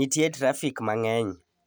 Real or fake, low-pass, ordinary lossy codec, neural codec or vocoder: real; none; none; none